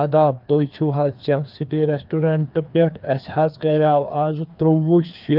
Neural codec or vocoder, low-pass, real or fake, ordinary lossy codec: codec, 16 kHz, 2 kbps, FreqCodec, larger model; 5.4 kHz; fake; Opus, 24 kbps